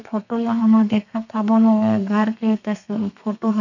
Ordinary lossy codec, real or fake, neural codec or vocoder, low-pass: none; fake; codec, 32 kHz, 1.9 kbps, SNAC; 7.2 kHz